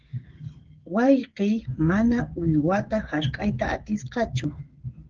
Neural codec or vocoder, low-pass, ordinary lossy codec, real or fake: codec, 16 kHz, 16 kbps, FreqCodec, smaller model; 7.2 kHz; Opus, 16 kbps; fake